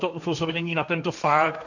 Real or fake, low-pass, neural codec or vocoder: fake; 7.2 kHz; codec, 16 kHz, 1.1 kbps, Voila-Tokenizer